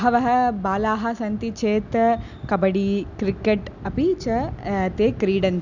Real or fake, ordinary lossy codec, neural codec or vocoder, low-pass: real; none; none; 7.2 kHz